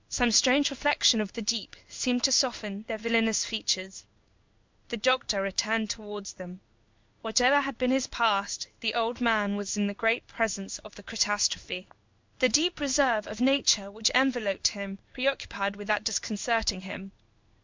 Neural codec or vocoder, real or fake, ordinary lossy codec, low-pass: codec, 16 kHz in and 24 kHz out, 1 kbps, XY-Tokenizer; fake; MP3, 64 kbps; 7.2 kHz